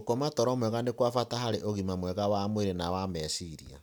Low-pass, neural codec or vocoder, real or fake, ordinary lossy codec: none; none; real; none